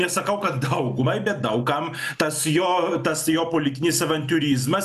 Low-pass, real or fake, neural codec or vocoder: 14.4 kHz; real; none